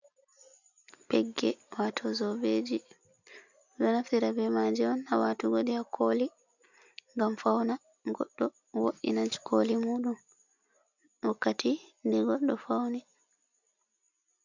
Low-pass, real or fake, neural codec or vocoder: 7.2 kHz; real; none